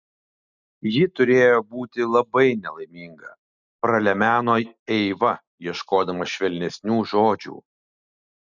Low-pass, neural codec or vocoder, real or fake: 7.2 kHz; none; real